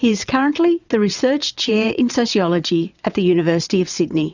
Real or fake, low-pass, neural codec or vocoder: fake; 7.2 kHz; vocoder, 22.05 kHz, 80 mel bands, WaveNeXt